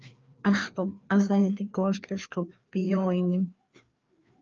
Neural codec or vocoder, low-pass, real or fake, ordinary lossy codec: codec, 16 kHz, 2 kbps, FreqCodec, larger model; 7.2 kHz; fake; Opus, 24 kbps